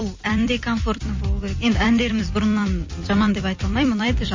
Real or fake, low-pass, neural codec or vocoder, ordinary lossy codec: fake; 7.2 kHz; vocoder, 44.1 kHz, 128 mel bands every 256 samples, BigVGAN v2; MP3, 32 kbps